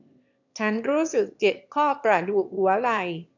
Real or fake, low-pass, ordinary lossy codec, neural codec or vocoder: fake; 7.2 kHz; none; autoencoder, 22.05 kHz, a latent of 192 numbers a frame, VITS, trained on one speaker